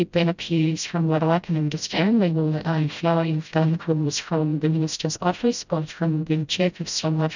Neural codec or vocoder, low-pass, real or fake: codec, 16 kHz, 0.5 kbps, FreqCodec, smaller model; 7.2 kHz; fake